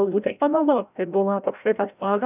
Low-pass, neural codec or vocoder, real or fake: 3.6 kHz; codec, 16 kHz, 0.5 kbps, FreqCodec, larger model; fake